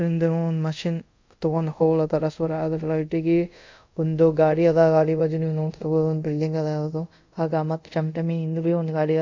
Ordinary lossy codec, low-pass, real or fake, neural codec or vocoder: MP3, 64 kbps; 7.2 kHz; fake; codec, 24 kHz, 0.5 kbps, DualCodec